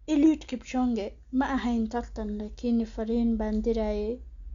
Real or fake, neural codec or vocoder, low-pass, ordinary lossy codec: real; none; 7.2 kHz; Opus, 64 kbps